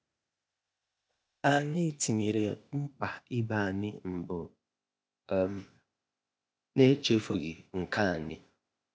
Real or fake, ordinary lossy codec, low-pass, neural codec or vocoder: fake; none; none; codec, 16 kHz, 0.8 kbps, ZipCodec